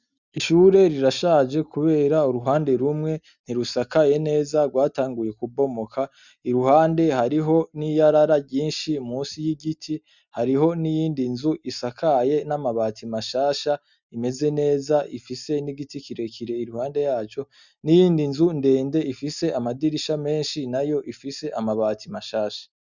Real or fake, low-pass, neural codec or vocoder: real; 7.2 kHz; none